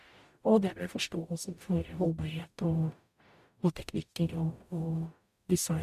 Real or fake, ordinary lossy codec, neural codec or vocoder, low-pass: fake; MP3, 96 kbps; codec, 44.1 kHz, 0.9 kbps, DAC; 14.4 kHz